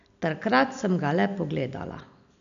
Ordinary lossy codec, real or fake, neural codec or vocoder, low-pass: none; real; none; 7.2 kHz